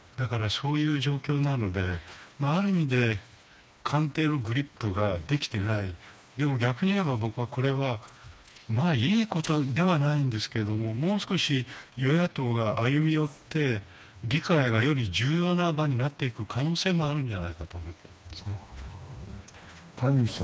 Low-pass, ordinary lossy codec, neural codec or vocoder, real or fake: none; none; codec, 16 kHz, 2 kbps, FreqCodec, smaller model; fake